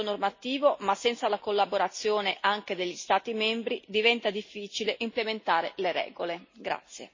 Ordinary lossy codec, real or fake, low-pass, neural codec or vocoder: MP3, 32 kbps; real; 7.2 kHz; none